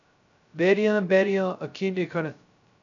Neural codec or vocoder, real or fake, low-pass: codec, 16 kHz, 0.2 kbps, FocalCodec; fake; 7.2 kHz